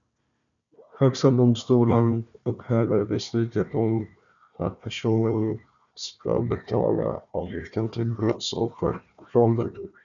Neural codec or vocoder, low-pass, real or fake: codec, 16 kHz, 1 kbps, FunCodec, trained on Chinese and English, 50 frames a second; 7.2 kHz; fake